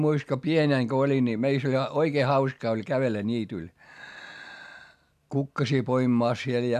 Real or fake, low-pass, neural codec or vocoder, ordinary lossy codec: real; 14.4 kHz; none; AAC, 96 kbps